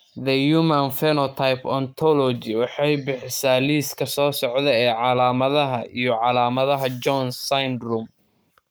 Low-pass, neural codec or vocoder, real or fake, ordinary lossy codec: none; codec, 44.1 kHz, 7.8 kbps, Pupu-Codec; fake; none